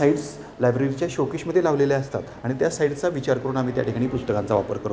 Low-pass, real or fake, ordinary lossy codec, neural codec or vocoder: none; real; none; none